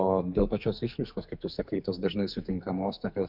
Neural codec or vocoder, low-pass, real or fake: codec, 44.1 kHz, 2.6 kbps, SNAC; 5.4 kHz; fake